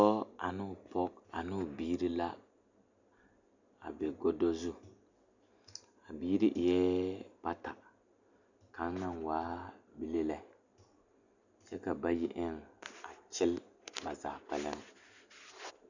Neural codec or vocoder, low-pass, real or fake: none; 7.2 kHz; real